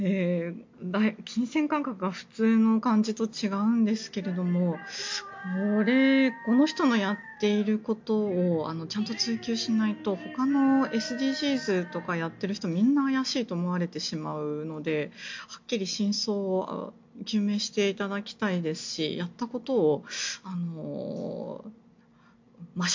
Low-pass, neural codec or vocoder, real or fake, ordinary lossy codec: 7.2 kHz; none; real; MP3, 48 kbps